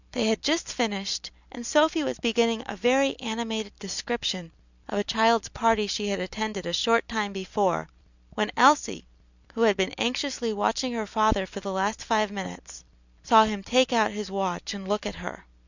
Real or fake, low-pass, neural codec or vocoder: real; 7.2 kHz; none